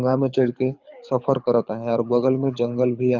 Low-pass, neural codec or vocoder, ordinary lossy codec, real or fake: 7.2 kHz; codec, 24 kHz, 6 kbps, HILCodec; Opus, 64 kbps; fake